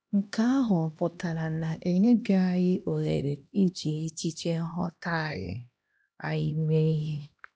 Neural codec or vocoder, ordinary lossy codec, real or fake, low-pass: codec, 16 kHz, 1 kbps, X-Codec, HuBERT features, trained on LibriSpeech; none; fake; none